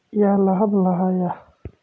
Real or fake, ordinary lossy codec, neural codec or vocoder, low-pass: real; none; none; none